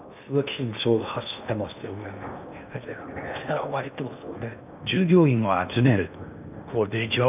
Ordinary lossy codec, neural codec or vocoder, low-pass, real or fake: none; codec, 16 kHz in and 24 kHz out, 0.8 kbps, FocalCodec, streaming, 65536 codes; 3.6 kHz; fake